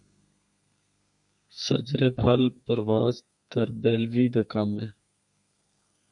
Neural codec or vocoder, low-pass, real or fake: codec, 32 kHz, 1.9 kbps, SNAC; 10.8 kHz; fake